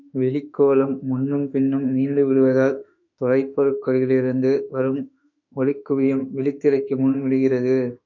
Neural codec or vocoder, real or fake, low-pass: autoencoder, 48 kHz, 32 numbers a frame, DAC-VAE, trained on Japanese speech; fake; 7.2 kHz